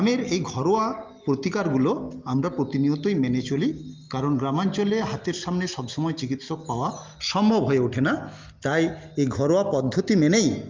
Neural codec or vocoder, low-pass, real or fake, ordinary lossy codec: none; 7.2 kHz; real; Opus, 32 kbps